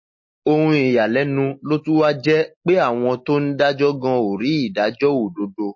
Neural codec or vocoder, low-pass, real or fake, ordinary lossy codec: none; 7.2 kHz; real; MP3, 32 kbps